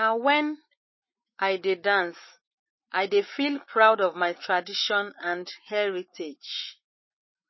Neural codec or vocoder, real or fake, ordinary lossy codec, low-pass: autoencoder, 48 kHz, 128 numbers a frame, DAC-VAE, trained on Japanese speech; fake; MP3, 24 kbps; 7.2 kHz